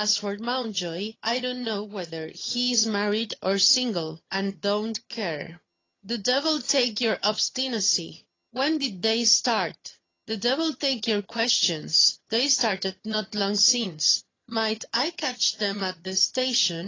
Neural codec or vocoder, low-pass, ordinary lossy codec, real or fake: vocoder, 22.05 kHz, 80 mel bands, HiFi-GAN; 7.2 kHz; AAC, 32 kbps; fake